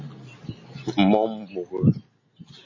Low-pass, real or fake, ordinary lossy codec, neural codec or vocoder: 7.2 kHz; real; MP3, 32 kbps; none